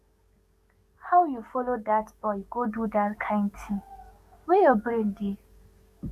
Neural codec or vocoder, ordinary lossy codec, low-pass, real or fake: codec, 44.1 kHz, 7.8 kbps, DAC; Opus, 64 kbps; 14.4 kHz; fake